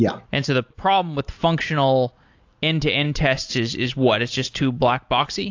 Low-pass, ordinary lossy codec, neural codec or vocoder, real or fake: 7.2 kHz; AAC, 48 kbps; none; real